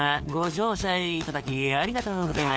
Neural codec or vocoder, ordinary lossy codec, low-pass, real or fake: codec, 16 kHz, 4 kbps, FunCodec, trained on LibriTTS, 50 frames a second; none; none; fake